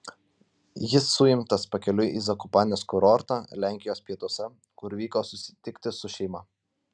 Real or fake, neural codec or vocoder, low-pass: real; none; 9.9 kHz